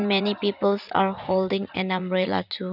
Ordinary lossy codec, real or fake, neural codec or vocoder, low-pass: none; fake; vocoder, 44.1 kHz, 80 mel bands, Vocos; 5.4 kHz